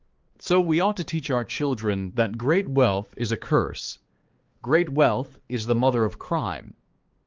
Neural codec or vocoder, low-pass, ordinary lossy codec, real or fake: codec, 16 kHz, 4 kbps, X-Codec, HuBERT features, trained on balanced general audio; 7.2 kHz; Opus, 16 kbps; fake